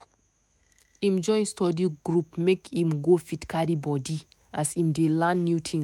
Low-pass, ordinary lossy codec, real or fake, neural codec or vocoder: 19.8 kHz; MP3, 96 kbps; fake; autoencoder, 48 kHz, 128 numbers a frame, DAC-VAE, trained on Japanese speech